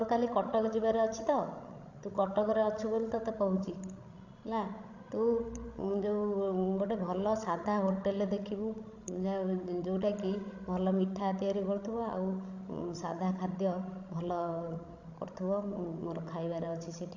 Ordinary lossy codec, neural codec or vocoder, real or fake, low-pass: AAC, 48 kbps; codec, 16 kHz, 16 kbps, FreqCodec, larger model; fake; 7.2 kHz